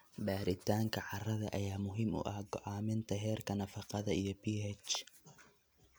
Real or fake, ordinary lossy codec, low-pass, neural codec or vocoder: real; none; none; none